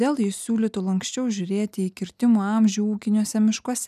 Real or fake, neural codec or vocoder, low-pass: real; none; 14.4 kHz